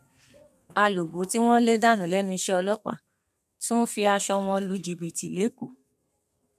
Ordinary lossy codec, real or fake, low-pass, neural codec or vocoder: MP3, 96 kbps; fake; 14.4 kHz; codec, 32 kHz, 1.9 kbps, SNAC